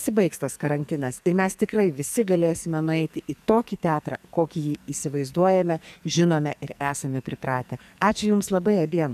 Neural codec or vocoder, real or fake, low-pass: codec, 44.1 kHz, 2.6 kbps, SNAC; fake; 14.4 kHz